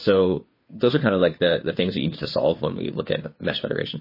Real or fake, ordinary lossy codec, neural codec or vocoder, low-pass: fake; MP3, 24 kbps; codec, 16 kHz, 2 kbps, FunCodec, trained on Chinese and English, 25 frames a second; 5.4 kHz